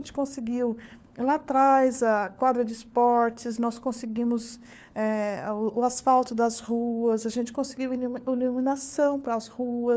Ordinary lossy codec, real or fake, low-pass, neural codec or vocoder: none; fake; none; codec, 16 kHz, 4 kbps, FunCodec, trained on LibriTTS, 50 frames a second